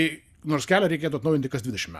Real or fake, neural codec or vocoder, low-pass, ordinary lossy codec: real; none; 14.4 kHz; Opus, 64 kbps